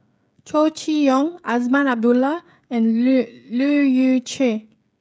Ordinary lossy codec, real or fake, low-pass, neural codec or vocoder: none; fake; none; codec, 16 kHz, 16 kbps, FreqCodec, smaller model